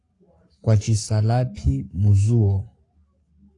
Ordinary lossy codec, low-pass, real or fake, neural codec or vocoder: AAC, 48 kbps; 10.8 kHz; fake; codec, 44.1 kHz, 7.8 kbps, Pupu-Codec